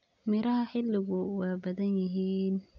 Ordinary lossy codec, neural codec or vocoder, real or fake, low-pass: none; none; real; 7.2 kHz